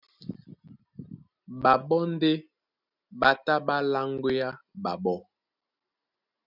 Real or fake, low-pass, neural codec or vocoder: real; 5.4 kHz; none